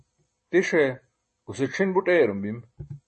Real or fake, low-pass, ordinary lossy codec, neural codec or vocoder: real; 10.8 kHz; MP3, 32 kbps; none